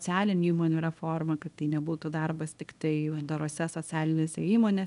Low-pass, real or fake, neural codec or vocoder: 10.8 kHz; fake; codec, 24 kHz, 0.9 kbps, WavTokenizer, small release